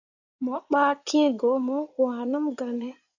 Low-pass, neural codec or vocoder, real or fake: 7.2 kHz; codec, 16 kHz in and 24 kHz out, 2.2 kbps, FireRedTTS-2 codec; fake